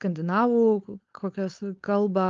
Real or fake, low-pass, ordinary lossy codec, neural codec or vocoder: fake; 7.2 kHz; Opus, 32 kbps; codec, 16 kHz, 4.8 kbps, FACodec